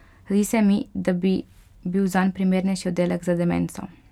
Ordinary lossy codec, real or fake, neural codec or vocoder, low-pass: none; real; none; 19.8 kHz